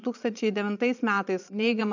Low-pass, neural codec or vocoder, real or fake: 7.2 kHz; vocoder, 22.05 kHz, 80 mel bands, Vocos; fake